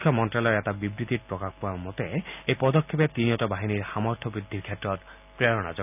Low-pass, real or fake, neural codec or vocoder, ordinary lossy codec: 3.6 kHz; real; none; none